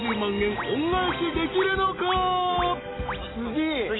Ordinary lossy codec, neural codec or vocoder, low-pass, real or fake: AAC, 16 kbps; none; 7.2 kHz; real